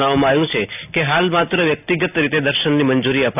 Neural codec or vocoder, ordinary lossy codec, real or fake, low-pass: none; none; real; 3.6 kHz